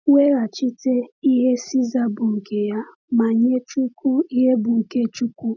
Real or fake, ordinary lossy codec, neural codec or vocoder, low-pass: real; none; none; 7.2 kHz